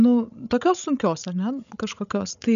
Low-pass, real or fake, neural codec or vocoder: 7.2 kHz; fake; codec, 16 kHz, 16 kbps, FreqCodec, larger model